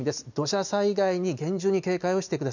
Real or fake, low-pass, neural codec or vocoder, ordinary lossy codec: real; 7.2 kHz; none; none